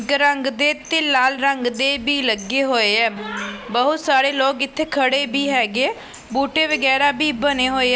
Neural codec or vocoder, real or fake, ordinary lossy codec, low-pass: none; real; none; none